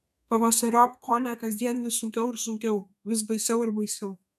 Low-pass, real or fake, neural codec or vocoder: 14.4 kHz; fake; codec, 32 kHz, 1.9 kbps, SNAC